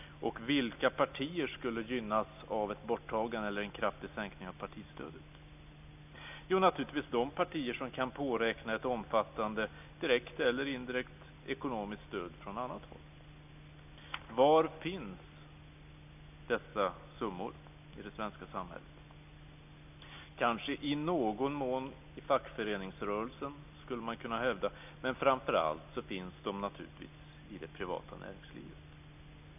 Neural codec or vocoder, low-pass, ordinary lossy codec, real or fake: none; 3.6 kHz; none; real